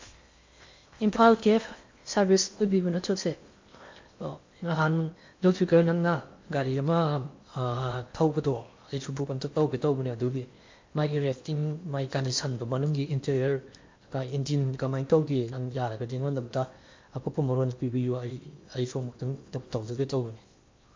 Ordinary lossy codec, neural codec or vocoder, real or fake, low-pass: MP3, 48 kbps; codec, 16 kHz in and 24 kHz out, 0.8 kbps, FocalCodec, streaming, 65536 codes; fake; 7.2 kHz